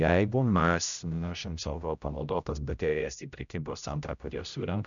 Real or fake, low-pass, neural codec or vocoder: fake; 7.2 kHz; codec, 16 kHz, 0.5 kbps, X-Codec, HuBERT features, trained on general audio